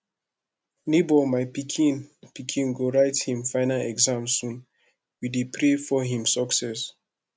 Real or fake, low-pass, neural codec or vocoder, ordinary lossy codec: real; none; none; none